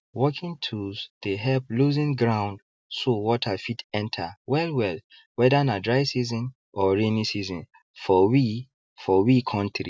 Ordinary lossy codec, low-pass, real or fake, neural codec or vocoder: none; none; real; none